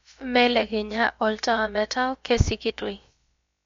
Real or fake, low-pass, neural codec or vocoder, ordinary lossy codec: fake; 7.2 kHz; codec, 16 kHz, about 1 kbps, DyCAST, with the encoder's durations; MP3, 48 kbps